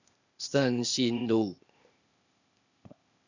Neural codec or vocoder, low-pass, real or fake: codec, 16 kHz, 0.8 kbps, ZipCodec; 7.2 kHz; fake